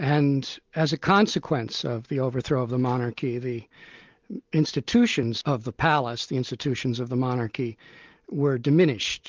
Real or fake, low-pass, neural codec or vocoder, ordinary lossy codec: real; 7.2 kHz; none; Opus, 32 kbps